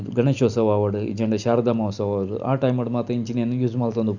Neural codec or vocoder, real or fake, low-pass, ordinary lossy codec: none; real; 7.2 kHz; none